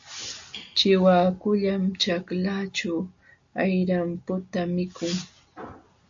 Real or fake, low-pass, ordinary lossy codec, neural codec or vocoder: real; 7.2 kHz; AAC, 64 kbps; none